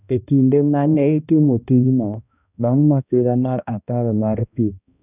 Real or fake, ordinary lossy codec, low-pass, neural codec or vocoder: fake; none; 3.6 kHz; codec, 16 kHz, 1 kbps, X-Codec, HuBERT features, trained on balanced general audio